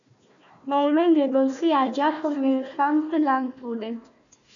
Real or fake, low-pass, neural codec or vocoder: fake; 7.2 kHz; codec, 16 kHz, 1 kbps, FunCodec, trained on Chinese and English, 50 frames a second